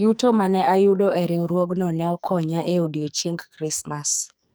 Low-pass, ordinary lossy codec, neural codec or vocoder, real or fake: none; none; codec, 44.1 kHz, 2.6 kbps, SNAC; fake